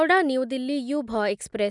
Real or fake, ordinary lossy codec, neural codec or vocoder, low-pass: real; none; none; 10.8 kHz